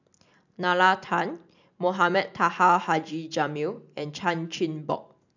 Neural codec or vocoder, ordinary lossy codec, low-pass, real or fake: none; none; 7.2 kHz; real